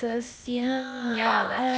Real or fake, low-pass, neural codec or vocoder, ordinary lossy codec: fake; none; codec, 16 kHz, 0.8 kbps, ZipCodec; none